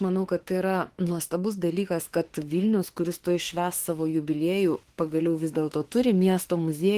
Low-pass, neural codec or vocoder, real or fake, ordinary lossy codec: 14.4 kHz; autoencoder, 48 kHz, 32 numbers a frame, DAC-VAE, trained on Japanese speech; fake; Opus, 16 kbps